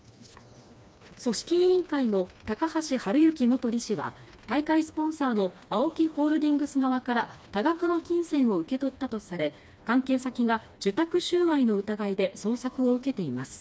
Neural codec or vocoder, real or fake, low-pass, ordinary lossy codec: codec, 16 kHz, 2 kbps, FreqCodec, smaller model; fake; none; none